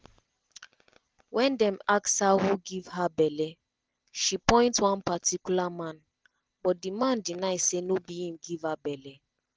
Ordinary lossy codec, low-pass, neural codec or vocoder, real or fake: none; none; none; real